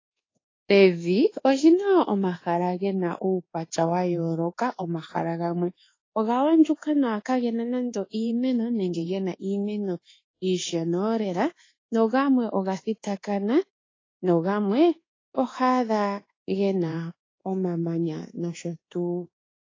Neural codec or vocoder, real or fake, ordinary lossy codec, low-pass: codec, 24 kHz, 1.2 kbps, DualCodec; fake; AAC, 32 kbps; 7.2 kHz